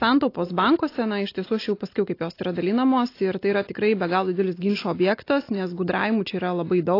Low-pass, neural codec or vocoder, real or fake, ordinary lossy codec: 5.4 kHz; none; real; AAC, 32 kbps